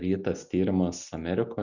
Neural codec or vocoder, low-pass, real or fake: none; 7.2 kHz; real